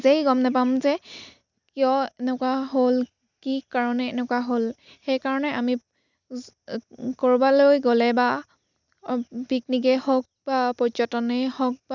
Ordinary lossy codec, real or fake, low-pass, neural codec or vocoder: none; real; 7.2 kHz; none